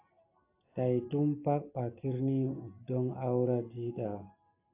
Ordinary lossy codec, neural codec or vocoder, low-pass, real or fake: AAC, 32 kbps; none; 3.6 kHz; real